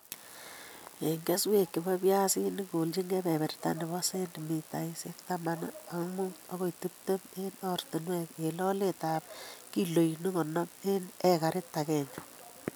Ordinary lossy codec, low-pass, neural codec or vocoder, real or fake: none; none; none; real